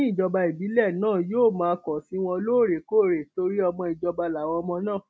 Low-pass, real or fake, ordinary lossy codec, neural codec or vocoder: none; real; none; none